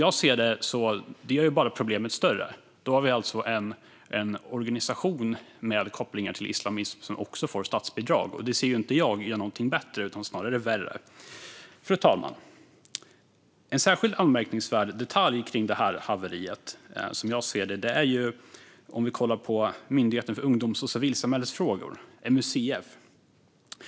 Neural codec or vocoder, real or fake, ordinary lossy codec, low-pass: none; real; none; none